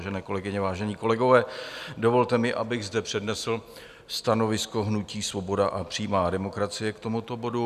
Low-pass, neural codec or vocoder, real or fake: 14.4 kHz; none; real